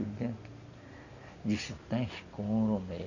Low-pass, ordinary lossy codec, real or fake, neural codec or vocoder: 7.2 kHz; none; real; none